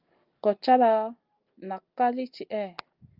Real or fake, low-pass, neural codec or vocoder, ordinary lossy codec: real; 5.4 kHz; none; Opus, 24 kbps